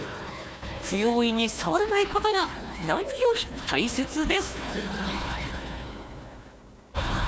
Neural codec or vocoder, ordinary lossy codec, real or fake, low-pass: codec, 16 kHz, 1 kbps, FunCodec, trained on Chinese and English, 50 frames a second; none; fake; none